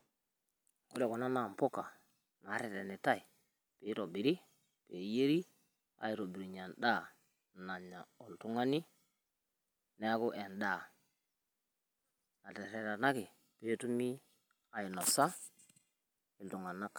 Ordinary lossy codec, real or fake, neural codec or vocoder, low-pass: none; real; none; none